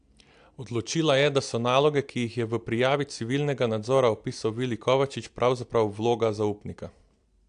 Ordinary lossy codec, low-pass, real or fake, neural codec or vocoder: AAC, 64 kbps; 9.9 kHz; real; none